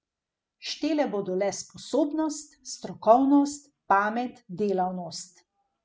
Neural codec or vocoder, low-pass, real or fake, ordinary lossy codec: none; none; real; none